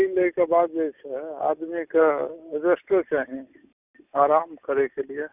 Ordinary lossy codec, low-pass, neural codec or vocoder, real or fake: none; 3.6 kHz; none; real